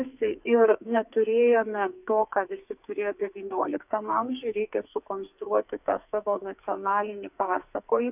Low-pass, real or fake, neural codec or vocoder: 3.6 kHz; fake; codec, 44.1 kHz, 2.6 kbps, SNAC